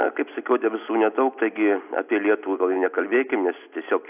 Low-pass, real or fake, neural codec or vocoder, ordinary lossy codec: 3.6 kHz; real; none; AAC, 32 kbps